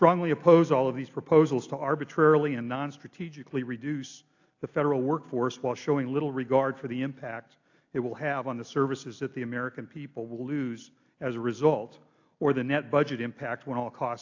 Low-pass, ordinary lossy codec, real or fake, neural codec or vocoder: 7.2 kHz; AAC, 48 kbps; real; none